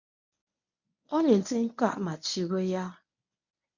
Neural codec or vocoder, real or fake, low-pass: codec, 24 kHz, 0.9 kbps, WavTokenizer, medium speech release version 1; fake; 7.2 kHz